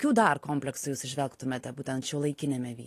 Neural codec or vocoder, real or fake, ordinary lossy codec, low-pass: none; real; AAC, 48 kbps; 14.4 kHz